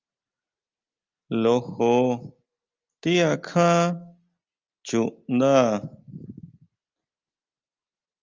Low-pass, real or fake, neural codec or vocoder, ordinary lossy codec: 7.2 kHz; real; none; Opus, 24 kbps